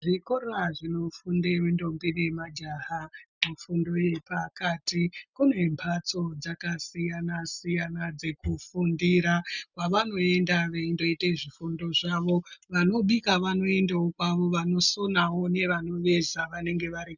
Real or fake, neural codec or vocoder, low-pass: real; none; 7.2 kHz